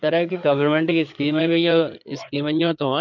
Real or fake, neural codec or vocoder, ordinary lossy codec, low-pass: fake; codec, 16 kHz in and 24 kHz out, 2.2 kbps, FireRedTTS-2 codec; none; 7.2 kHz